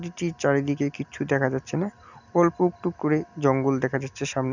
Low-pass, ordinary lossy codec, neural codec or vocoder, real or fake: 7.2 kHz; none; none; real